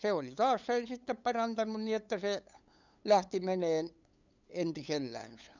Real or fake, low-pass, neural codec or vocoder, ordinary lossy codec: fake; 7.2 kHz; codec, 16 kHz, 8 kbps, FunCodec, trained on LibriTTS, 25 frames a second; none